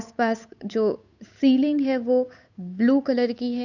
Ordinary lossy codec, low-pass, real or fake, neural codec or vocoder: none; 7.2 kHz; fake; codec, 16 kHz, 8 kbps, FunCodec, trained on Chinese and English, 25 frames a second